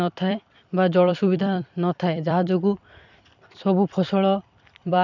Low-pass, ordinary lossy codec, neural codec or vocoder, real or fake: 7.2 kHz; none; vocoder, 44.1 kHz, 128 mel bands every 256 samples, BigVGAN v2; fake